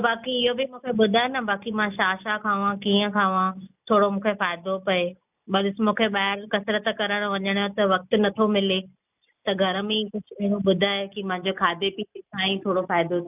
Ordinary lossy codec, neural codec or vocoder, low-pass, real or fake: none; none; 3.6 kHz; real